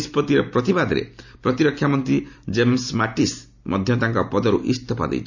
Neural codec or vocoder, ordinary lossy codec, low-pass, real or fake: none; none; 7.2 kHz; real